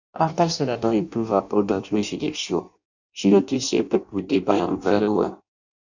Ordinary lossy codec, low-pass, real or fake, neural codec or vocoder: none; 7.2 kHz; fake; codec, 16 kHz in and 24 kHz out, 0.6 kbps, FireRedTTS-2 codec